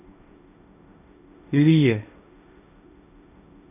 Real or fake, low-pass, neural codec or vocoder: fake; 3.6 kHz; codec, 16 kHz, 1.1 kbps, Voila-Tokenizer